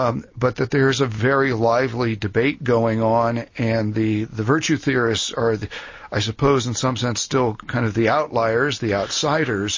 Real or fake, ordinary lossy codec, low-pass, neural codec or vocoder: real; MP3, 32 kbps; 7.2 kHz; none